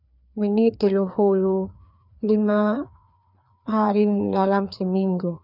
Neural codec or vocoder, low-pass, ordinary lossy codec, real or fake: codec, 16 kHz, 2 kbps, FreqCodec, larger model; 5.4 kHz; none; fake